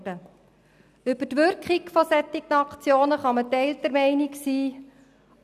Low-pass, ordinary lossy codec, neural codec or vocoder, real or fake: 14.4 kHz; none; none; real